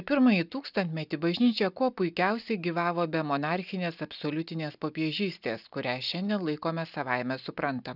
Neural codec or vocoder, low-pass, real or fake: none; 5.4 kHz; real